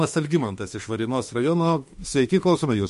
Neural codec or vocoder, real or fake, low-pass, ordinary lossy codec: autoencoder, 48 kHz, 32 numbers a frame, DAC-VAE, trained on Japanese speech; fake; 14.4 kHz; MP3, 48 kbps